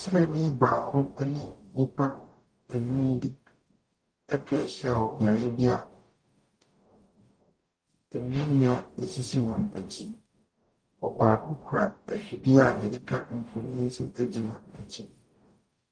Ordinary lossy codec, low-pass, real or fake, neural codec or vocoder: Opus, 32 kbps; 9.9 kHz; fake; codec, 44.1 kHz, 0.9 kbps, DAC